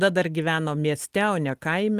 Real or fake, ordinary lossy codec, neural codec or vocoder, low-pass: real; Opus, 24 kbps; none; 14.4 kHz